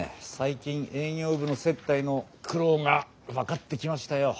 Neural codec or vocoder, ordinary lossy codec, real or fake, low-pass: none; none; real; none